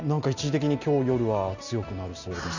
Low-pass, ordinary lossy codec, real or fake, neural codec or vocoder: 7.2 kHz; none; real; none